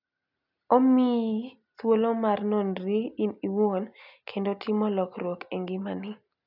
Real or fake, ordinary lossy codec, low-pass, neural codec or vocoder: real; none; 5.4 kHz; none